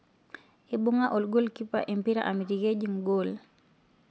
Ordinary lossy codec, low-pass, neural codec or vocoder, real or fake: none; none; none; real